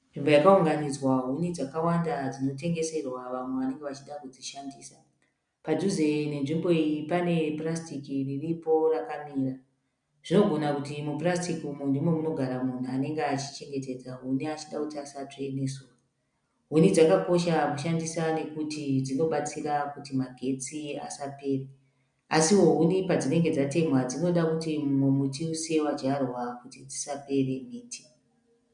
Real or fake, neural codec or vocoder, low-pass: real; none; 9.9 kHz